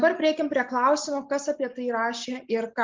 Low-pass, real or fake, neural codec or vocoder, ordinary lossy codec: 7.2 kHz; real; none; Opus, 24 kbps